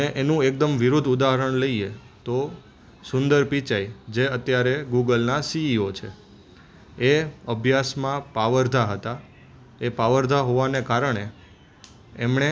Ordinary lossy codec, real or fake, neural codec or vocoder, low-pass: none; real; none; none